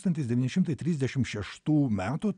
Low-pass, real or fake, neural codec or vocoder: 9.9 kHz; real; none